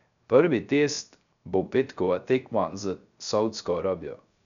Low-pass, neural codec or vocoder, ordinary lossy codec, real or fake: 7.2 kHz; codec, 16 kHz, 0.3 kbps, FocalCodec; none; fake